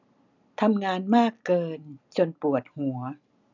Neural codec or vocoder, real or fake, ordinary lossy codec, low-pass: none; real; none; 7.2 kHz